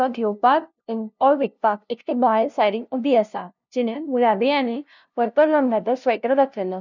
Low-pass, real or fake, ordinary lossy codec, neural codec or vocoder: 7.2 kHz; fake; none; codec, 16 kHz, 0.5 kbps, FunCodec, trained on LibriTTS, 25 frames a second